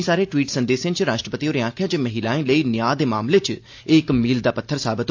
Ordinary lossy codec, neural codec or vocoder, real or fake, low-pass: AAC, 48 kbps; none; real; 7.2 kHz